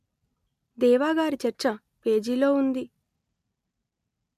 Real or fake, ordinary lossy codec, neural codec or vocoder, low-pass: real; MP3, 96 kbps; none; 14.4 kHz